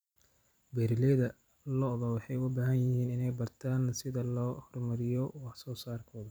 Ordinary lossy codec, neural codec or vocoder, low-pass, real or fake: none; vocoder, 44.1 kHz, 128 mel bands every 256 samples, BigVGAN v2; none; fake